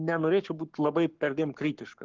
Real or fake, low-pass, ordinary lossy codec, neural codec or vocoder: fake; 7.2 kHz; Opus, 16 kbps; codec, 44.1 kHz, 7.8 kbps, Pupu-Codec